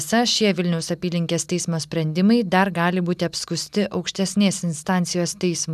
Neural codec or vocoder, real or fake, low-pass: none; real; 14.4 kHz